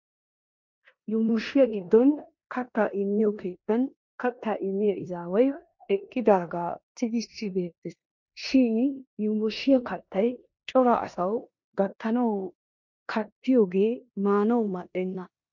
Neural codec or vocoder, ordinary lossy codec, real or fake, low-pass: codec, 16 kHz in and 24 kHz out, 0.9 kbps, LongCat-Audio-Codec, four codebook decoder; MP3, 48 kbps; fake; 7.2 kHz